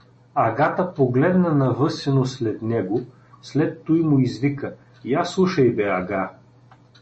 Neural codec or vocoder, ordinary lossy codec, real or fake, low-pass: none; MP3, 32 kbps; real; 10.8 kHz